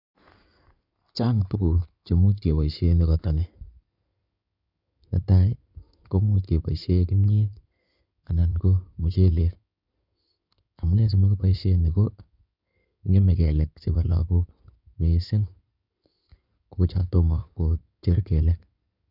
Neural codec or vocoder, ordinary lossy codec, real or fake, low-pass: codec, 16 kHz in and 24 kHz out, 2.2 kbps, FireRedTTS-2 codec; none; fake; 5.4 kHz